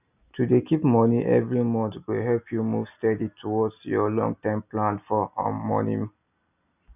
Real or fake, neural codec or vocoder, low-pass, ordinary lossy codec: real; none; 3.6 kHz; none